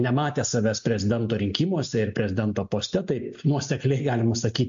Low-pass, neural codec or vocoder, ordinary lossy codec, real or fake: 7.2 kHz; none; MP3, 48 kbps; real